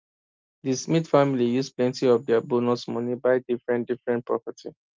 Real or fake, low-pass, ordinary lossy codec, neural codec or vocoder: real; 7.2 kHz; Opus, 24 kbps; none